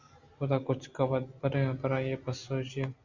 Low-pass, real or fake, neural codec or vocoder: 7.2 kHz; real; none